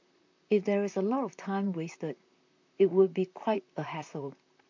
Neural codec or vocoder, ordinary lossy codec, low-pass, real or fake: vocoder, 44.1 kHz, 128 mel bands, Pupu-Vocoder; MP3, 48 kbps; 7.2 kHz; fake